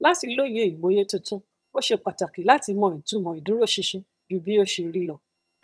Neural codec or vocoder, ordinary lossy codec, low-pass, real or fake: vocoder, 22.05 kHz, 80 mel bands, HiFi-GAN; none; none; fake